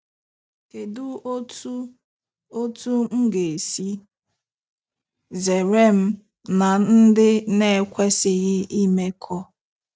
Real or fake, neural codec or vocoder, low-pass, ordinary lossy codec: real; none; none; none